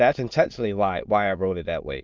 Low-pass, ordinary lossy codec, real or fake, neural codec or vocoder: 7.2 kHz; Opus, 32 kbps; fake; autoencoder, 22.05 kHz, a latent of 192 numbers a frame, VITS, trained on many speakers